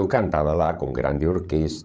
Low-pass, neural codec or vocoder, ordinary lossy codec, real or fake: none; codec, 16 kHz, 16 kbps, FreqCodec, larger model; none; fake